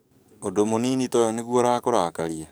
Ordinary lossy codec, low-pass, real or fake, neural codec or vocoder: none; none; fake; codec, 44.1 kHz, 7.8 kbps, DAC